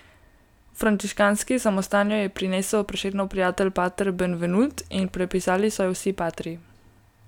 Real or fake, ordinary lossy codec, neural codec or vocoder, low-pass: real; none; none; 19.8 kHz